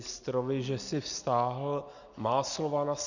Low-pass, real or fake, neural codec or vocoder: 7.2 kHz; real; none